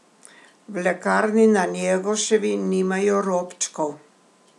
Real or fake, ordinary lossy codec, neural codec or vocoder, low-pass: real; none; none; none